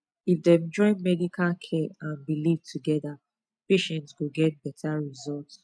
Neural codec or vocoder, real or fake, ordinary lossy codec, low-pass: none; real; none; none